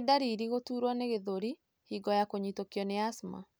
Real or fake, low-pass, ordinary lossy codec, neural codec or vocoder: real; none; none; none